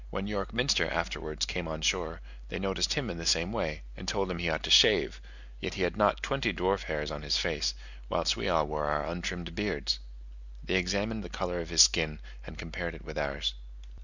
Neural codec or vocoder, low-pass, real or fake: none; 7.2 kHz; real